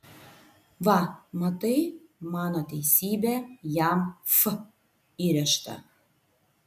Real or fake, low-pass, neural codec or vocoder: real; 14.4 kHz; none